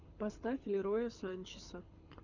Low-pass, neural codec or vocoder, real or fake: 7.2 kHz; codec, 24 kHz, 6 kbps, HILCodec; fake